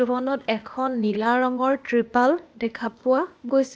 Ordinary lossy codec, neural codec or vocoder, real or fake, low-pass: none; codec, 16 kHz, 0.8 kbps, ZipCodec; fake; none